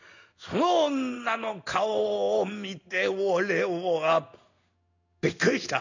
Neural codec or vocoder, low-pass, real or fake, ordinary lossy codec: codec, 16 kHz in and 24 kHz out, 1 kbps, XY-Tokenizer; 7.2 kHz; fake; none